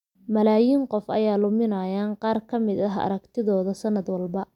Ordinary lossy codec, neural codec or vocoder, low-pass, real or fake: none; none; 19.8 kHz; real